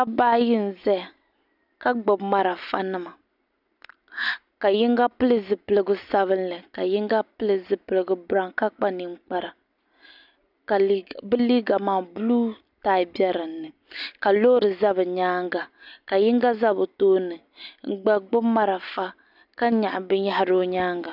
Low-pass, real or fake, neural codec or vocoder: 5.4 kHz; real; none